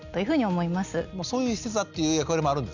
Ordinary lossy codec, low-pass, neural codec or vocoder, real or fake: none; 7.2 kHz; none; real